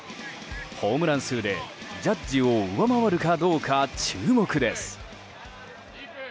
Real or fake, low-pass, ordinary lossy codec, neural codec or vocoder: real; none; none; none